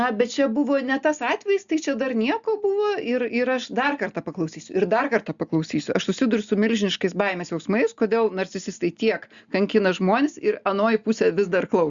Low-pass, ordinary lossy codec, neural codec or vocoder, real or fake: 7.2 kHz; Opus, 64 kbps; none; real